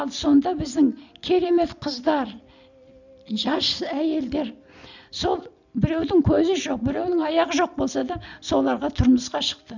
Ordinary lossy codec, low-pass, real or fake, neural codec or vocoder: none; 7.2 kHz; real; none